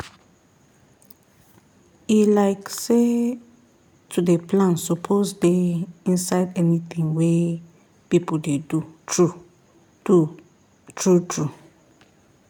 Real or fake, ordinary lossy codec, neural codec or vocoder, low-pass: real; none; none; 19.8 kHz